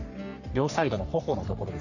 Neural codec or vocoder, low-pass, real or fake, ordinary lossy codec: codec, 44.1 kHz, 3.4 kbps, Pupu-Codec; 7.2 kHz; fake; none